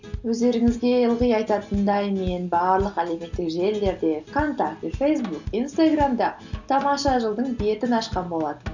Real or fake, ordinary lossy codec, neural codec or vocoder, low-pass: real; none; none; 7.2 kHz